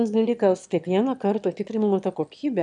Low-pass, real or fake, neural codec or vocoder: 9.9 kHz; fake; autoencoder, 22.05 kHz, a latent of 192 numbers a frame, VITS, trained on one speaker